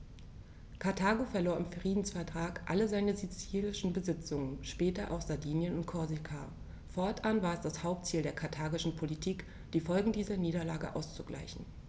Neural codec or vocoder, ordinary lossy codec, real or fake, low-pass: none; none; real; none